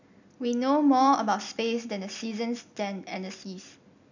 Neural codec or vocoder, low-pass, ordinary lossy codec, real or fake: none; 7.2 kHz; none; real